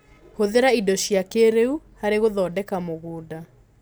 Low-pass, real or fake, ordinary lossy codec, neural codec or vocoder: none; real; none; none